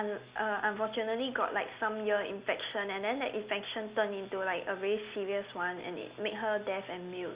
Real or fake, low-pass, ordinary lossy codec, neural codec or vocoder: real; 3.6 kHz; Opus, 64 kbps; none